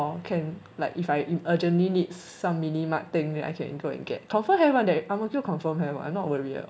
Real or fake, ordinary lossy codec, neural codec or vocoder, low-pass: real; none; none; none